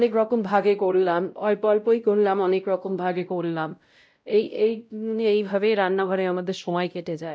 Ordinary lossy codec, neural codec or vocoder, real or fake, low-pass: none; codec, 16 kHz, 0.5 kbps, X-Codec, WavLM features, trained on Multilingual LibriSpeech; fake; none